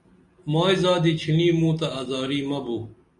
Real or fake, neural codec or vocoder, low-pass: real; none; 10.8 kHz